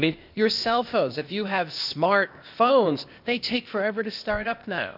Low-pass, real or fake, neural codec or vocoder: 5.4 kHz; fake; codec, 16 kHz, 0.8 kbps, ZipCodec